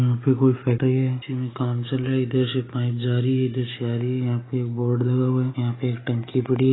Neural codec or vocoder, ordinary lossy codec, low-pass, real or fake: none; AAC, 16 kbps; 7.2 kHz; real